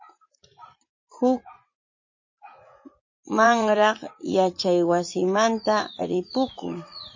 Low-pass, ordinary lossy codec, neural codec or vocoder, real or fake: 7.2 kHz; MP3, 32 kbps; vocoder, 44.1 kHz, 80 mel bands, Vocos; fake